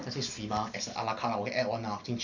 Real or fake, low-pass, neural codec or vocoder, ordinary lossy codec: real; 7.2 kHz; none; none